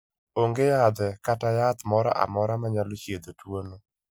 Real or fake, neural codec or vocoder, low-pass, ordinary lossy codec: real; none; none; none